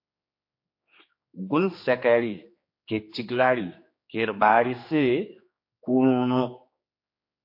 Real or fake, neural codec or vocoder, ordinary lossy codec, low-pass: fake; codec, 16 kHz, 2 kbps, X-Codec, HuBERT features, trained on general audio; MP3, 32 kbps; 5.4 kHz